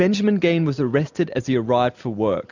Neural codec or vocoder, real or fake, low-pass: none; real; 7.2 kHz